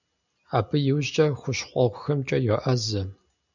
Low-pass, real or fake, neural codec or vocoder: 7.2 kHz; real; none